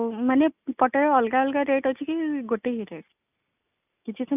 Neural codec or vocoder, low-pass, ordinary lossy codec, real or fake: none; 3.6 kHz; none; real